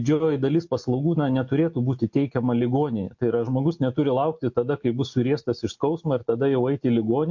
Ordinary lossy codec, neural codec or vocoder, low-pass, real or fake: MP3, 48 kbps; none; 7.2 kHz; real